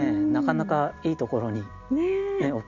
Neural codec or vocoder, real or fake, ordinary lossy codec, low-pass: none; real; none; 7.2 kHz